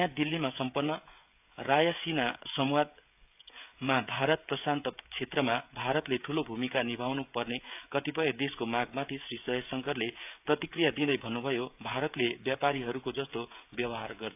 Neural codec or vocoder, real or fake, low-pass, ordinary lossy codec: codec, 16 kHz, 16 kbps, FreqCodec, smaller model; fake; 3.6 kHz; AAC, 32 kbps